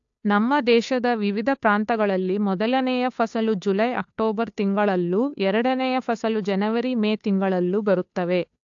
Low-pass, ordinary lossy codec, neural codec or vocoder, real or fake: 7.2 kHz; AAC, 64 kbps; codec, 16 kHz, 2 kbps, FunCodec, trained on Chinese and English, 25 frames a second; fake